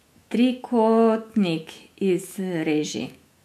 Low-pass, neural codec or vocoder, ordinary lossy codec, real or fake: 14.4 kHz; autoencoder, 48 kHz, 128 numbers a frame, DAC-VAE, trained on Japanese speech; MP3, 64 kbps; fake